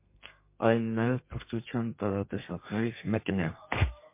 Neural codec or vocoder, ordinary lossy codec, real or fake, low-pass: codec, 32 kHz, 1.9 kbps, SNAC; MP3, 24 kbps; fake; 3.6 kHz